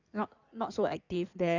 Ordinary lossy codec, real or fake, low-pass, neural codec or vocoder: none; fake; 7.2 kHz; codec, 16 kHz in and 24 kHz out, 2.2 kbps, FireRedTTS-2 codec